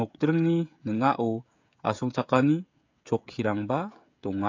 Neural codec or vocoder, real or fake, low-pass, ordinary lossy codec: codec, 16 kHz, 16 kbps, FreqCodec, smaller model; fake; 7.2 kHz; AAC, 48 kbps